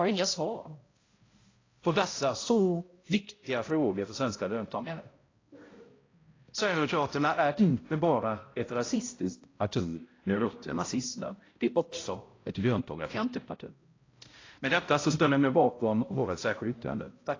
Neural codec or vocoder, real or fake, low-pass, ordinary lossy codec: codec, 16 kHz, 0.5 kbps, X-Codec, HuBERT features, trained on balanced general audio; fake; 7.2 kHz; AAC, 32 kbps